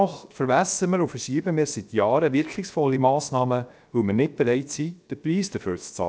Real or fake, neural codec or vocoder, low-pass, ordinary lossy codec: fake; codec, 16 kHz, 0.7 kbps, FocalCodec; none; none